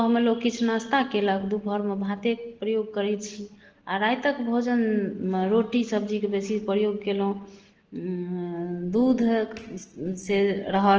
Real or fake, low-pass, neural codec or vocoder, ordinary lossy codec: real; 7.2 kHz; none; Opus, 16 kbps